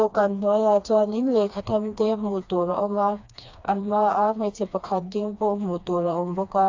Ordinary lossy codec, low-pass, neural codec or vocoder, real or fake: AAC, 48 kbps; 7.2 kHz; codec, 16 kHz, 2 kbps, FreqCodec, smaller model; fake